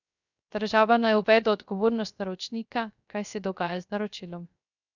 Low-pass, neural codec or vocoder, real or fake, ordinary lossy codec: 7.2 kHz; codec, 16 kHz, 0.3 kbps, FocalCodec; fake; none